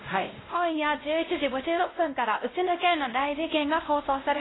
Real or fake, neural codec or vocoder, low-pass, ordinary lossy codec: fake; codec, 16 kHz, 0.5 kbps, X-Codec, WavLM features, trained on Multilingual LibriSpeech; 7.2 kHz; AAC, 16 kbps